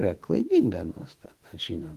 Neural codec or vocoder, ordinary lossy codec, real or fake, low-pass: autoencoder, 48 kHz, 32 numbers a frame, DAC-VAE, trained on Japanese speech; Opus, 24 kbps; fake; 14.4 kHz